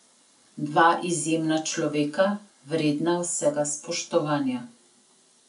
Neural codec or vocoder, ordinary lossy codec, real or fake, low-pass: none; MP3, 96 kbps; real; 10.8 kHz